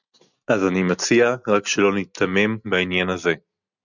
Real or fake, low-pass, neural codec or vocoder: real; 7.2 kHz; none